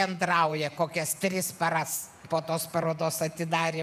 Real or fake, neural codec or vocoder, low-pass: fake; vocoder, 48 kHz, 128 mel bands, Vocos; 14.4 kHz